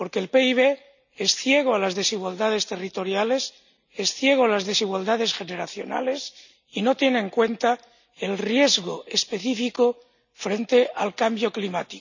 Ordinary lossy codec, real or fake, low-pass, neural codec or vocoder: none; real; 7.2 kHz; none